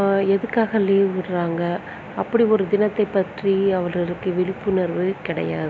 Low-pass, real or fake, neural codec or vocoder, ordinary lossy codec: none; real; none; none